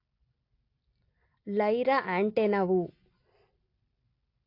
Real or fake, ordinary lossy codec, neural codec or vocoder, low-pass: real; AAC, 32 kbps; none; 5.4 kHz